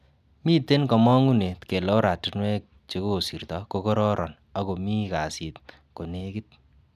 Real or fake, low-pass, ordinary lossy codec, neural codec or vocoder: real; 14.4 kHz; none; none